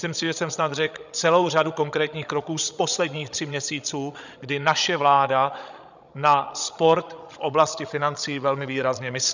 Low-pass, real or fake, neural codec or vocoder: 7.2 kHz; fake; codec, 16 kHz, 16 kbps, FreqCodec, larger model